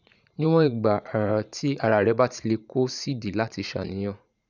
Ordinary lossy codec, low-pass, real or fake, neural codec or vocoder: none; 7.2 kHz; fake; vocoder, 44.1 kHz, 80 mel bands, Vocos